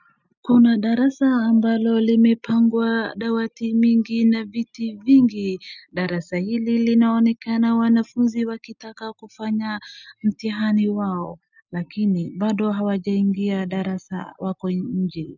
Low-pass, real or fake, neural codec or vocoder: 7.2 kHz; real; none